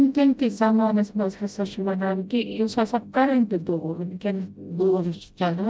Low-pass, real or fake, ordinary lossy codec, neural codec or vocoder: none; fake; none; codec, 16 kHz, 0.5 kbps, FreqCodec, smaller model